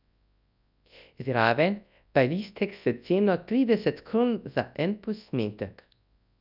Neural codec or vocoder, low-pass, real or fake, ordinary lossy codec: codec, 24 kHz, 0.9 kbps, WavTokenizer, large speech release; 5.4 kHz; fake; none